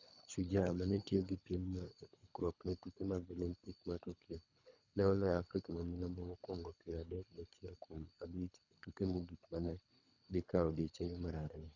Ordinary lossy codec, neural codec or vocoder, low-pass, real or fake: none; codec, 24 kHz, 3 kbps, HILCodec; 7.2 kHz; fake